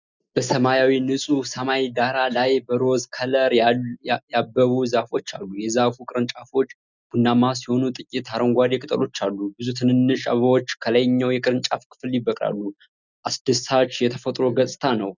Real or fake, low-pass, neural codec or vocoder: real; 7.2 kHz; none